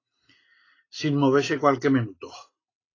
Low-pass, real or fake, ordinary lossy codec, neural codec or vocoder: 7.2 kHz; real; AAC, 32 kbps; none